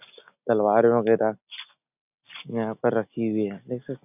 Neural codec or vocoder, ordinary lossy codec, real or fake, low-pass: none; none; real; 3.6 kHz